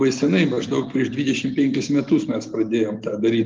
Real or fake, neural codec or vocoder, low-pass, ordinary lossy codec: real; none; 7.2 kHz; Opus, 32 kbps